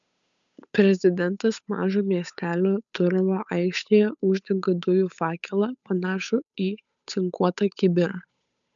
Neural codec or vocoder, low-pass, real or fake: codec, 16 kHz, 8 kbps, FunCodec, trained on Chinese and English, 25 frames a second; 7.2 kHz; fake